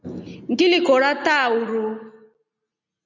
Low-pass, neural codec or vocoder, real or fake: 7.2 kHz; none; real